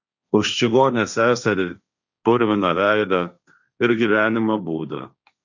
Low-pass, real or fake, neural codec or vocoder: 7.2 kHz; fake; codec, 16 kHz, 1.1 kbps, Voila-Tokenizer